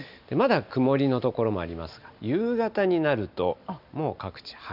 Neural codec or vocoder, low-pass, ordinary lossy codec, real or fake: none; 5.4 kHz; none; real